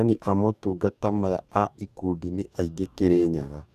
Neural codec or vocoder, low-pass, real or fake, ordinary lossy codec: codec, 44.1 kHz, 2.6 kbps, DAC; 14.4 kHz; fake; none